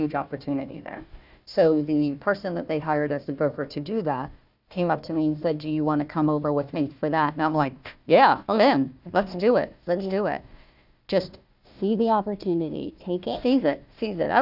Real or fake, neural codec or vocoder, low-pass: fake; codec, 16 kHz, 1 kbps, FunCodec, trained on Chinese and English, 50 frames a second; 5.4 kHz